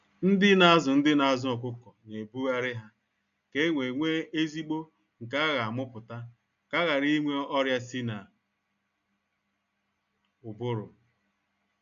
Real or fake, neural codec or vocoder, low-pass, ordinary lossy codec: real; none; 7.2 kHz; none